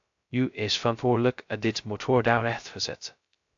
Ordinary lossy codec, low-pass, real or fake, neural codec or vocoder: AAC, 64 kbps; 7.2 kHz; fake; codec, 16 kHz, 0.2 kbps, FocalCodec